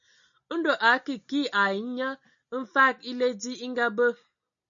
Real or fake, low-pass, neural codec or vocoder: real; 7.2 kHz; none